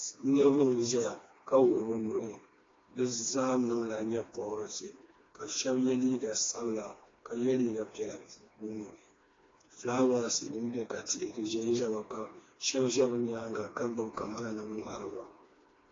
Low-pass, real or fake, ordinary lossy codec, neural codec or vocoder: 7.2 kHz; fake; AAC, 32 kbps; codec, 16 kHz, 2 kbps, FreqCodec, smaller model